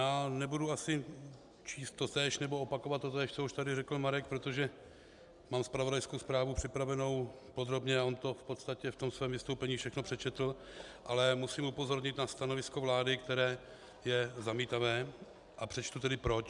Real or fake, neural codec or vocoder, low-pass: real; none; 10.8 kHz